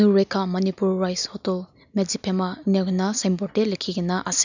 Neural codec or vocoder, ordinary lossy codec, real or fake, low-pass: none; none; real; 7.2 kHz